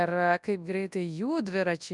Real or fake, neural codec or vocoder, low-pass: fake; codec, 24 kHz, 0.9 kbps, WavTokenizer, large speech release; 10.8 kHz